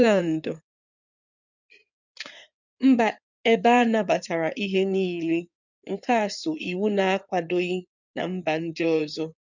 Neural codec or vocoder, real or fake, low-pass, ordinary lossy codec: codec, 16 kHz in and 24 kHz out, 2.2 kbps, FireRedTTS-2 codec; fake; 7.2 kHz; none